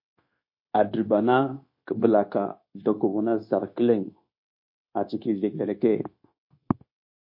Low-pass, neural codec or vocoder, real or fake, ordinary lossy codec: 5.4 kHz; codec, 16 kHz, 0.9 kbps, LongCat-Audio-Codec; fake; MP3, 32 kbps